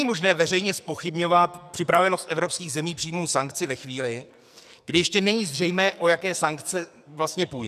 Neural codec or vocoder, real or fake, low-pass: codec, 44.1 kHz, 2.6 kbps, SNAC; fake; 14.4 kHz